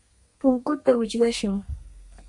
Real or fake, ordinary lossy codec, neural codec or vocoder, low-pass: fake; MP3, 64 kbps; codec, 32 kHz, 1.9 kbps, SNAC; 10.8 kHz